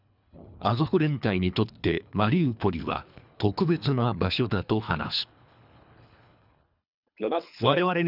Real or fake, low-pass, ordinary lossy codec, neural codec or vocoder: fake; 5.4 kHz; none; codec, 24 kHz, 3 kbps, HILCodec